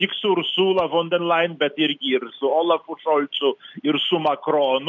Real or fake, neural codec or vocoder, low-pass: real; none; 7.2 kHz